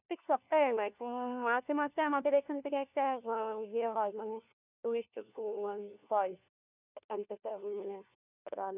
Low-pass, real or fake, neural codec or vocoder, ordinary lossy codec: 3.6 kHz; fake; codec, 16 kHz, 1 kbps, FunCodec, trained on LibriTTS, 50 frames a second; none